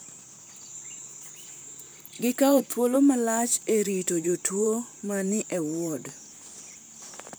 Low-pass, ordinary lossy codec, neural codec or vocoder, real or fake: none; none; vocoder, 44.1 kHz, 128 mel bands, Pupu-Vocoder; fake